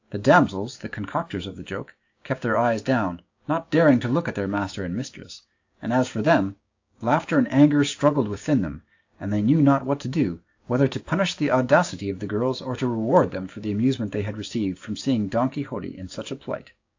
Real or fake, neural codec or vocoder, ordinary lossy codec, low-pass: fake; codec, 16 kHz, 6 kbps, DAC; AAC, 48 kbps; 7.2 kHz